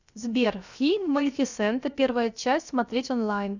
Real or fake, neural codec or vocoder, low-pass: fake; codec, 16 kHz, about 1 kbps, DyCAST, with the encoder's durations; 7.2 kHz